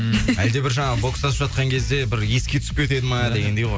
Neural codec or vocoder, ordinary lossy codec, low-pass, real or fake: none; none; none; real